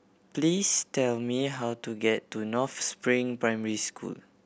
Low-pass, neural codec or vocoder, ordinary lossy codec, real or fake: none; none; none; real